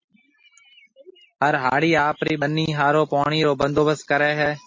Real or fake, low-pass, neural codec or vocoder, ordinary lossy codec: real; 7.2 kHz; none; MP3, 32 kbps